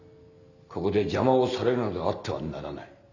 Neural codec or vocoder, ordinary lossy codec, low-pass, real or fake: none; none; 7.2 kHz; real